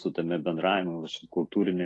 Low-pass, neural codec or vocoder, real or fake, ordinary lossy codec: 10.8 kHz; none; real; AAC, 32 kbps